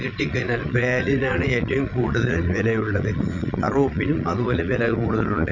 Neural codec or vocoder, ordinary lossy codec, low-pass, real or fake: vocoder, 22.05 kHz, 80 mel bands, Vocos; none; 7.2 kHz; fake